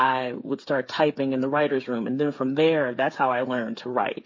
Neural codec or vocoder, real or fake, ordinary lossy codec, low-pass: codec, 16 kHz, 8 kbps, FreqCodec, smaller model; fake; MP3, 32 kbps; 7.2 kHz